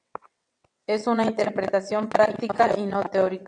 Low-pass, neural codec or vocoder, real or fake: 9.9 kHz; vocoder, 22.05 kHz, 80 mel bands, WaveNeXt; fake